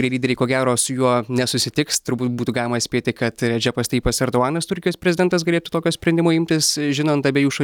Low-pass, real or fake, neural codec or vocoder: 19.8 kHz; real; none